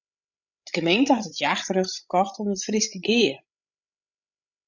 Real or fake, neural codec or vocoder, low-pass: fake; codec, 16 kHz, 16 kbps, FreqCodec, larger model; 7.2 kHz